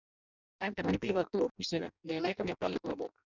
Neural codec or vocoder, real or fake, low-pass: codec, 16 kHz in and 24 kHz out, 0.6 kbps, FireRedTTS-2 codec; fake; 7.2 kHz